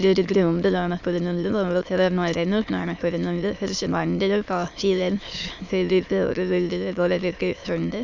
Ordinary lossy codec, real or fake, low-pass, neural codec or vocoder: none; fake; 7.2 kHz; autoencoder, 22.05 kHz, a latent of 192 numbers a frame, VITS, trained on many speakers